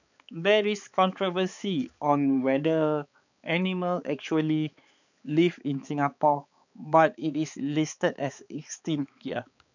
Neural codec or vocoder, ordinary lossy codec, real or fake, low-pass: codec, 16 kHz, 4 kbps, X-Codec, HuBERT features, trained on balanced general audio; none; fake; 7.2 kHz